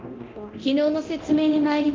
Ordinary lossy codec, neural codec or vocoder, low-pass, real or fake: Opus, 32 kbps; codec, 24 kHz, 0.9 kbps, DualCodec; 7.2 kHz; fake